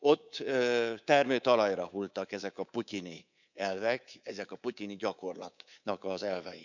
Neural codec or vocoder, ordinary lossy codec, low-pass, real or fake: codec, 24 kHz, 3.1 kbps, DualCodec; none; 7.2 kHz; fake